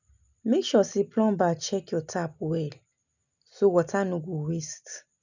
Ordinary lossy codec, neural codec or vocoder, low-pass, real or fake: none; none; 7.2 kHz; real